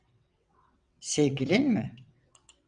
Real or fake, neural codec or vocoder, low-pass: fake; vocoder, 22.05 kHz, 80 mel bands, WaveNeXt; 9.9 kHz